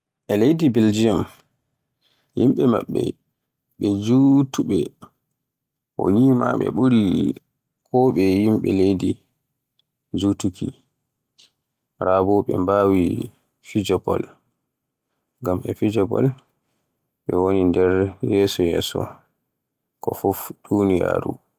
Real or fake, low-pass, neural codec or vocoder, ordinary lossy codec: real; 14.4 kHz; none; Opus, 32 kbps